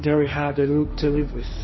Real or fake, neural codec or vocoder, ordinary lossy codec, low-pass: fake; codec, 16 kHz, 1.1 kbps, Voila-Tokenizer; MP3, 24 kbps; 7.2 kHz